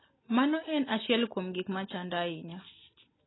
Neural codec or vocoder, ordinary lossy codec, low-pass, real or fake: none; AAC, 16 kbps; 7.2 kHz; real